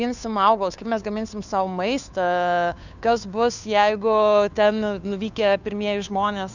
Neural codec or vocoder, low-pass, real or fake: codec, 16 kHz, 2 kbps, FunCodec, trained on Chinese and English, 25 frames a second; 7.2 kHz; fake